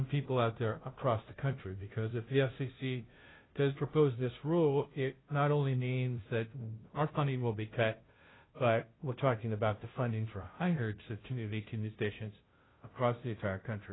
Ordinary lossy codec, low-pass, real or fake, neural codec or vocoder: AAC, 16 kbps; 7.2 kHz; fake; codec, 16 kHz, 0.5 kbps, FunCodec, trained on Chinese and English, 25 frames a second